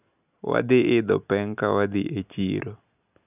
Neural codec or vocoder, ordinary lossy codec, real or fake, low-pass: none; none; real; 3.6 kHz